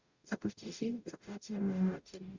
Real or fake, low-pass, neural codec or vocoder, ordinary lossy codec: fake; 7.2 kHz; codec, 44.1 kHz, 0.9 kbps, DAC; Opus, 64 kbps